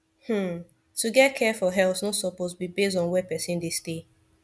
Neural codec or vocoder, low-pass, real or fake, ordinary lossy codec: none; none; real; none